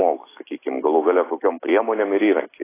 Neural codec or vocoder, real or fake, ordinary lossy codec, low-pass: none; real; AAC, 16 kbps; 3.6 kHz